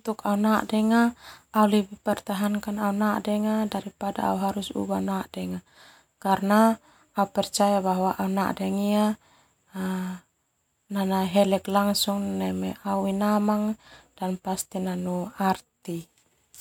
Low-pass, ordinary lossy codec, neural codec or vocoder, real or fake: 19.8 kHz; MP3, 96 kbps; none; real